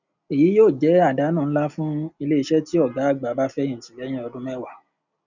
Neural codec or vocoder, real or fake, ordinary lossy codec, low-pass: none; real; none; 7.2 kHz